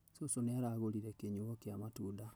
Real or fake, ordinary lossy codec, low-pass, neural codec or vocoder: real; none; none; none